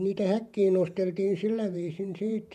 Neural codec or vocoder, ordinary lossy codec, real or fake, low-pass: vocoder, 48 kHz, 128 mel bands, Vocos; none; fake; 14.4 kHz